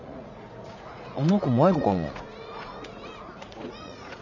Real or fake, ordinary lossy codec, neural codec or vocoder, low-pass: fake; none; vocoder, 44.1 kHz, 80 mel bands, Vocos; 7.2 kHz